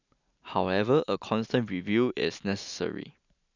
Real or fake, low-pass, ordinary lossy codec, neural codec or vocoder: real; 7.2 kHz; none; none